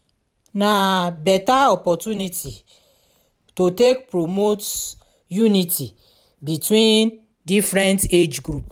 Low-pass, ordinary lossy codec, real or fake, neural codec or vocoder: 19.8 kHz; none; fake; vocoder, 44.1 kHz, 128 mel bands every 512 samples, BigVGAN v2